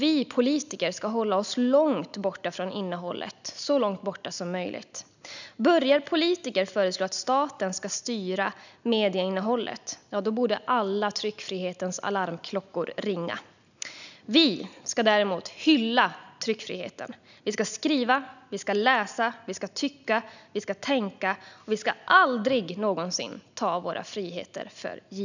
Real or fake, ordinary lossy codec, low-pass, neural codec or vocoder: real; none; 7.2 kHz; none